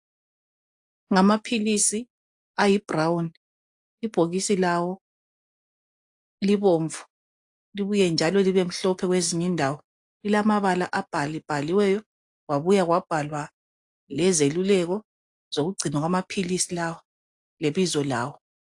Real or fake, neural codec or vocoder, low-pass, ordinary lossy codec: real; none; 10.8 kHz; AAC, 64 kbps